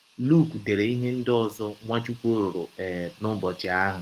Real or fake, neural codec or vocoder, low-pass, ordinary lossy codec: fake; codec, 44.1 kHz, 7.8 kbps, DAC; 14.4 kHz; Opus, 32 kbps